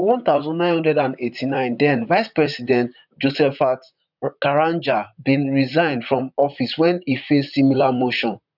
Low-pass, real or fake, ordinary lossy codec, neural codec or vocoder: 5.4 kHz; fake; none; vocoder, 44.1 kHz, 128 mel bands, Pupu-Vocoder